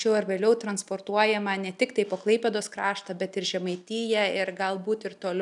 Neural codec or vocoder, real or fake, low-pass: none; real; 10.8 kHz